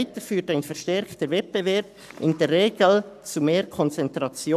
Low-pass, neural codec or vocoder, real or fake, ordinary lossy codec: 14.4 kHz; codec, 44.1 kHz, 7.8 kbps, Pupu-Codec; fake; none